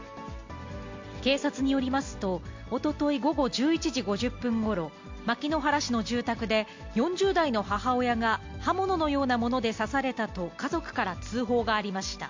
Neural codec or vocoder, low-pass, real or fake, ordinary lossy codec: none; 7.2 kHz; real; MP3, 48 kbps